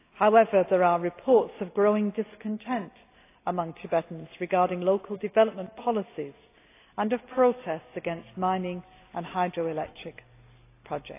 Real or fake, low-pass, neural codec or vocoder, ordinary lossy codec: real; 3.6 kHz; none; AAC, 24 kbps